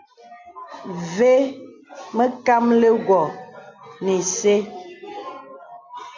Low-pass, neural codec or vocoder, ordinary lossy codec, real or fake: 7.2 kHz; none; MP3, 48 kbps; real